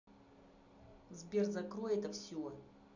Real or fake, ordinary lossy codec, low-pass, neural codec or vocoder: real; none; 7.2 kHz; none